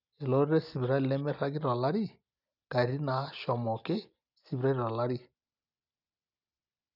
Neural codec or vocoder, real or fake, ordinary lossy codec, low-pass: none; real; none; 5.4 kHz